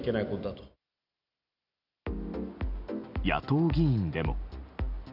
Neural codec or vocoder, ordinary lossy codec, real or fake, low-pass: none; none; real; 5.4 kHz